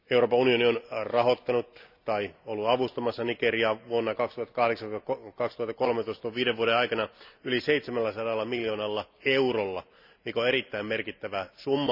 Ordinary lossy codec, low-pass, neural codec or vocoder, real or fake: none; 5.4 kHz; none; real